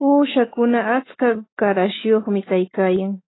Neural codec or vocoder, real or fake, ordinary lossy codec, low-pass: autoencoder, 48 kHz, 128 numbers a frame, DAC-VAE, trained on Japanese speech; fake; AAC, 16 kbps; 7.2 kHz